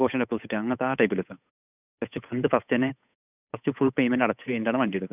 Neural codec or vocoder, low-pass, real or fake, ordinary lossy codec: codec, 16 kHz, 8 kbps, FunCodec, trained on Chinese and English, 25 frames a second; 3.6 kHz; fake; none